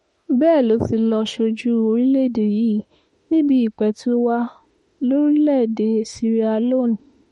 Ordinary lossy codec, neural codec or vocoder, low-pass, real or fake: MP3, 48 kbps; autoencoder, 48 kHz, 32 numbers a frame, DAC-VAE, trained on Japanese speech; 19.8 kHz; fake